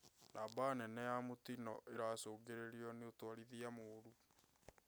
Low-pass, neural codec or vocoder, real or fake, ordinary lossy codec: none; none; real; none